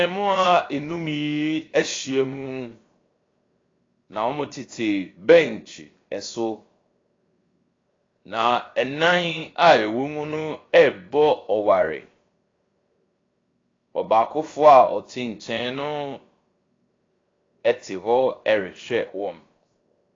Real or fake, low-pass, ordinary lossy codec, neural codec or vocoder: fake; 7.2 kHz; AAC, 32 kbps; codec, 16 kHz, 0.7 kbps, FocalCodec